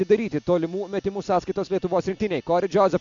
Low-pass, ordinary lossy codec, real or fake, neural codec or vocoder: 7.2 kHz; MP3, 64 kbps; real; none